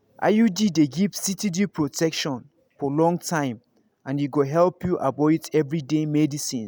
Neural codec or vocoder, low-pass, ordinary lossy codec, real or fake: none; none; none; real